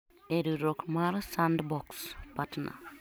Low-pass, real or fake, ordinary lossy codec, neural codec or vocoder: none; real; none; none